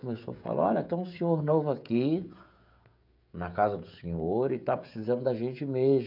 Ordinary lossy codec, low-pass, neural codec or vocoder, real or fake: MP3, 48 kbps; 5.4 kHz; codec, 16 kHz, 16 kbps, FreqCodec, smaller model; fake